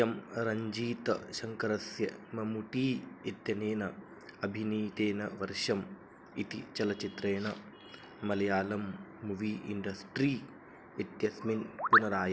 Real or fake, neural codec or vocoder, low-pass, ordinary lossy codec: real; none; none; none